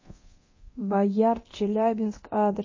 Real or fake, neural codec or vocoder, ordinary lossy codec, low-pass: fake; codec, 24 kHz, 0.9 kbps, DualCodec; MP3, 32 kbps; 7.2 kHz